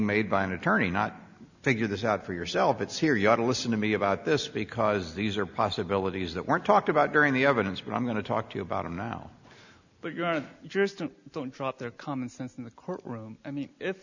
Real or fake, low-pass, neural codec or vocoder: real; 7.2 kHz; none